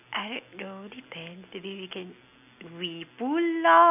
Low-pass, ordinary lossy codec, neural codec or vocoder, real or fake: 3.6 kHz; none; none; real